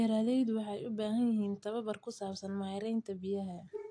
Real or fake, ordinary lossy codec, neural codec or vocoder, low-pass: real; AAC, 48 kbps; none; 9.9 kHz